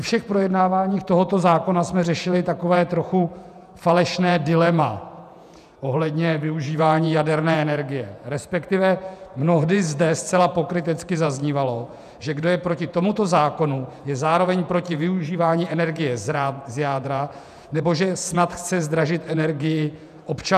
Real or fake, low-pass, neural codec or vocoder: fake; 14.4 kHz; vocoder, 48 kHz, 128 mel bands, Vocos